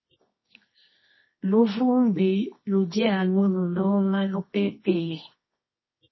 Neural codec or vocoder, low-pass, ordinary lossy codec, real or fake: codec, 24 kHz, 0.9 kbps, WavTokenizer, medium music audio release; 7.2 kHz; MP3, 24 kbps; fake